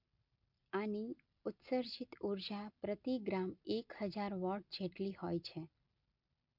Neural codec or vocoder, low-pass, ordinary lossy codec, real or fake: none; 5.4 kHz; MP3, 48 kbps; real